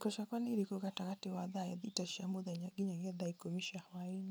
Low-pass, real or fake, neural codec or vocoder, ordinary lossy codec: none; real; none; none